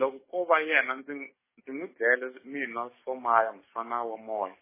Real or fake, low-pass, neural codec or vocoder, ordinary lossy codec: fake; 3.6 kHz; codec, 44.1 kHz, 7.8 kbps, DAC; MP3, 16 kbps